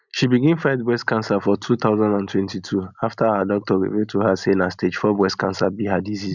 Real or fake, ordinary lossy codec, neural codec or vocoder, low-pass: real; none; none; 7.2 kHz